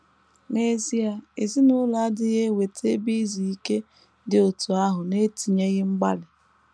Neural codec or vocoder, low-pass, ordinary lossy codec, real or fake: none; none; none; real